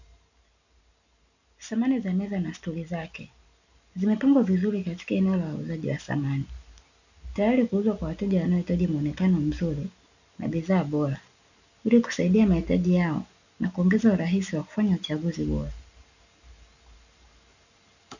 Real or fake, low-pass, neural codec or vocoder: real; 7.2 kHz; none